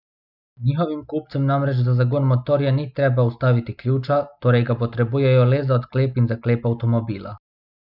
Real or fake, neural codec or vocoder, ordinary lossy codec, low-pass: real; none; none; 5.4 kHz